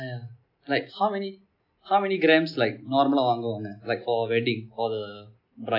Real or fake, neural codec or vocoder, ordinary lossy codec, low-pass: real; none; none; 5.4 kHz